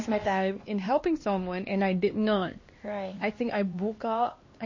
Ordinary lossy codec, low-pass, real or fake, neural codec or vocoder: MP3, 32 kbps; 7.2 kHz; fake; codec, 16 kHz, 2 kbps, X-Codec, HuBERT features, trained on LibriSpeech